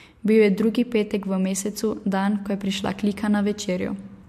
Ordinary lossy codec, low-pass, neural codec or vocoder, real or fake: MP3, 64 kbps; 14.4 kHz; none; real